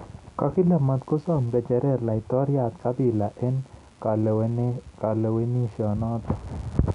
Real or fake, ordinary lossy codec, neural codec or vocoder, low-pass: real; none; none; 10.8 kHz